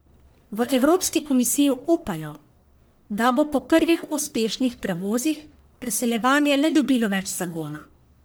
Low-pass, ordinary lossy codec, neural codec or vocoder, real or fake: none; none; codec, 44.1 kHz, 1.7 kbps, Pupu-Codec; fake